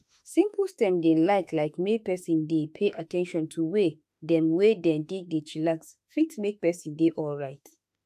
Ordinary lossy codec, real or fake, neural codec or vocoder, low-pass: AAC, 96 kbps; fake; autoencoder, 48 kHz, 32 numbers a frame, DAC-VAE, trained on Japanese speech; 14.4 kHz